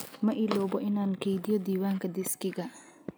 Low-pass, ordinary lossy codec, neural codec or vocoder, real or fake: none; none; none; real